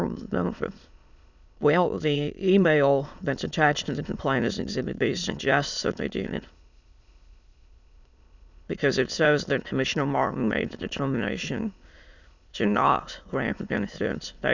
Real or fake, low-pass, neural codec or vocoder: fake; 7.2 kHz; autoencoder, 22.05 kHz, a latent of 192 numbers a frame, VITS, trained on many speakers